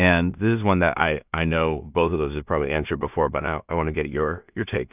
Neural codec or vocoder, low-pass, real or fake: codec, 16 kHz in and 24 kHz out, 0.4 kbps, LongCat-Audio-Codec, two codebook decoder; 3.6 kHz; fake